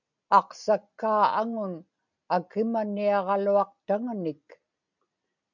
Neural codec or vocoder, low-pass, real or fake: none; 7.2 kHz; real